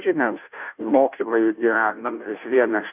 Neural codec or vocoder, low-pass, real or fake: codec, 16 kHz, 0.5 kbps, FunCodec, trained on Chinese and English, 25 frames a second; 3.6 kHz; fake